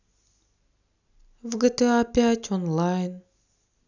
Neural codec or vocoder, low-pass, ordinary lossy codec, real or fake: none; 7.2 kHz; none; real